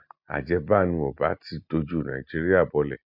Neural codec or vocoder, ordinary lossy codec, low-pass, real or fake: none; MP3, 48 kbps; 5.4 kHz; real